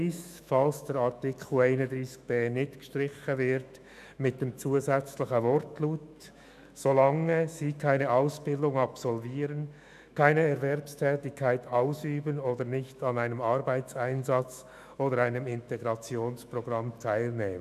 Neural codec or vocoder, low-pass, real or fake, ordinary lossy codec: autoencoder, 48 kHz, 128 numbers a frame, DAC-VAE, trained on Japanese speech; 14.4 kHz; fake; none